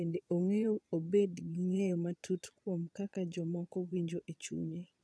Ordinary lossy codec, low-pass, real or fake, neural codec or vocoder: none; none; fake; vocoder, 22.05 kHz, 80 mel bands, Vocos